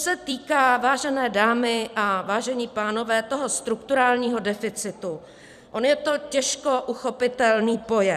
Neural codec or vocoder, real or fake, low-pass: vocoder, 44.1 kHz, 128 mel bands every 256 samples, BigVGAN v2; fake; 14.4 kHz